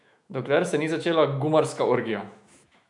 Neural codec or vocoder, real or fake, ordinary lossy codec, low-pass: autoencoder, 48 kHz, 128 numbers a frame, DAC-VAE, trained on Japanese speech; fake; MP3, 96 kbps; 10.8 kHz